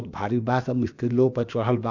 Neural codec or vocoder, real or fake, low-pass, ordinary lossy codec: codec, 16 kHz, about 1 kbps, DyCAST, with the encoder's durations; fake; 7.2 kHz; none